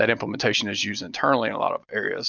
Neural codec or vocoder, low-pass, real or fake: none; 7.2 kHz; real